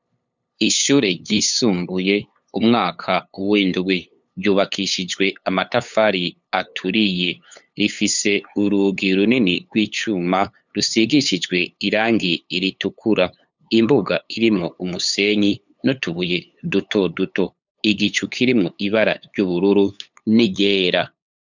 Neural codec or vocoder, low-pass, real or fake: codec, 16 kHz, 8 kbps, FunCodec, trained on LibriTTS, 25 frames a second; 7.2 kHz; fake